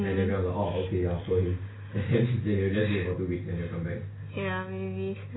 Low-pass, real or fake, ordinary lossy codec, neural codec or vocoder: 7.2 kHz; real; AAC, 16 kbps; none